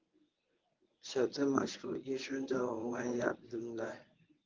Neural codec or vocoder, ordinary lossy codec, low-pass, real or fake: codec, 24 kHz, 0.9 kbps, WavTokenizer, medium speech release version 1; Opus, 32 kbps; 7.2 kHz; fake